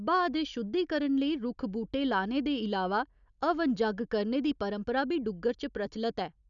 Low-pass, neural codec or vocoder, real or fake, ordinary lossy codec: 7.2 kHz; none; real; none